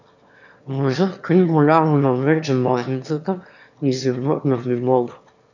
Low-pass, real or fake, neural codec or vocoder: 7.2 kHz; fake; autoencoder, 22.05 kHz, a latent of 192 numbers a frame, VITS, trained on one speaker